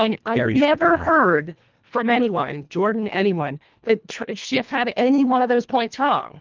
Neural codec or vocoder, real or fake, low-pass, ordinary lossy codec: codec, 24 kHz, 1.5 kbps, HILCodec; fake; 7.2 kHz; Opus, 32 kbps